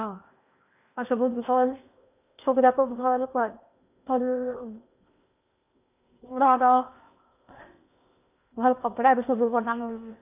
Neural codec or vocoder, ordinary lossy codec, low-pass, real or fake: codec, 16 kHz in and 24 kHz out, 0.8 kbps, FocalCodec, streaming, 65536 codes; none; 3.6 kHz; fake